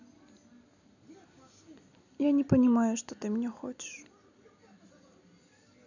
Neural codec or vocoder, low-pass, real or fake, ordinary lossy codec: none; 7.2 kHz; real; none